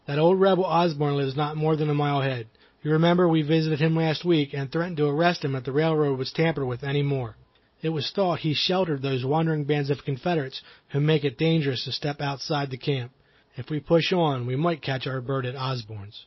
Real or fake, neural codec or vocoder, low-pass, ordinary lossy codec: real; none; 7.2 kHz; MP3, 24 kbps